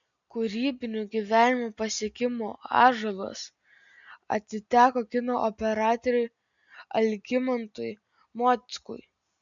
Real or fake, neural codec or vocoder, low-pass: real; none; 7.2 kHz